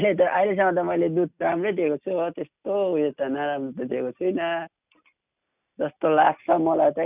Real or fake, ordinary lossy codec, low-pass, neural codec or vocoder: real; none; 3.6 kHz; none